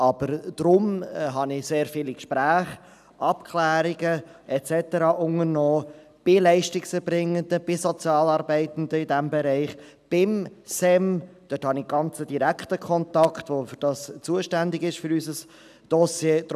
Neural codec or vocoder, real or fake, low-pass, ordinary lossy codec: none; real; 14.4 kHz; none